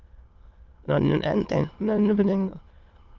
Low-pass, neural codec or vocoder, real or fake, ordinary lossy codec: 7.2 kHz; autoencoder, 22.05 kHz, a latent of 192 numbers a frame, VITS, trained on many speakers; fake; Opus, 32 kbps